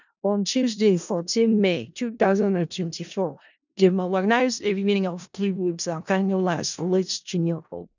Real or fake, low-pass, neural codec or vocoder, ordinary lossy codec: fake; 7.2 kHz; codec, 16 kHz in and 24 kHz out, 0.4 kbps, LongCat-Audio-Codec, four codebook decoder; none